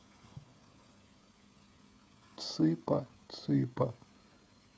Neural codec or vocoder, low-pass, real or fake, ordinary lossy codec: codec, 16 kHz, 16 kbps, FreqCodec, smaller model; none; fake; none